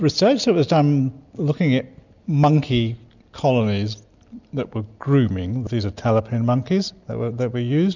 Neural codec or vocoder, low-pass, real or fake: none; 7.2 kHz; real